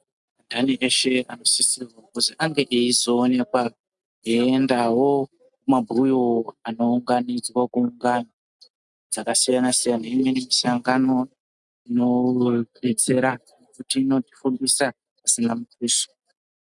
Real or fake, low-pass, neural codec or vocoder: real; 10.8 kHz; none